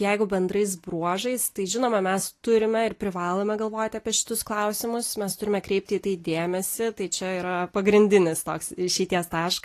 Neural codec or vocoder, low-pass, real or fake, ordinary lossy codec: none; 14.4 kHz; real; AAC, 48 kbps